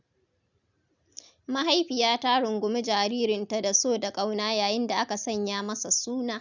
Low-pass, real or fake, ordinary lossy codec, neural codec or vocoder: 7.2 kHz; real; none; none